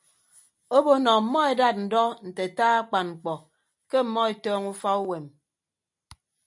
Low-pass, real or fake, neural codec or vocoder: 10.8 kHz; real; none